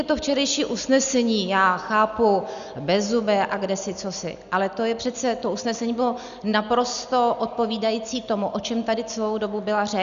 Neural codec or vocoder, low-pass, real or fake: none; 7.2 kHz; real